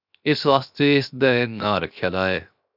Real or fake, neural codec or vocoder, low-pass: fake; codec, 16 kHz, 0.7 kbps, FocalCodec; 5.4 kHz